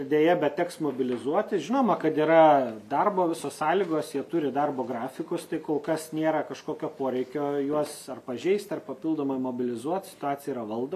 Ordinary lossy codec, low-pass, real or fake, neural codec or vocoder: MP3, 96 kbps; 14.4 kHz; real; none